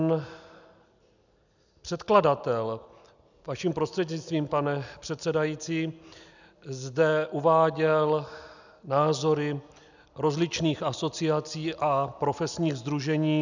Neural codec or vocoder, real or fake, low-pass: none; real; 7.2 kHz